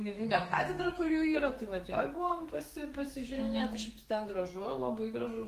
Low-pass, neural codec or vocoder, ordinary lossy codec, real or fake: 14.4 kHz; codec, 32 kHz, 1.9 kbps, SNAC; Opus, 24 kbps; fake